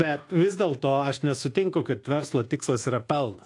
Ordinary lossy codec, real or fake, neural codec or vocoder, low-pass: AAC, 48 kbps; fake; codec, 24 kHz, 1.2 kbps, DualCodec; 10.8 kHz